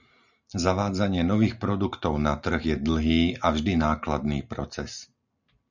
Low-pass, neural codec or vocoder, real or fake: 7.2 kHz; none; real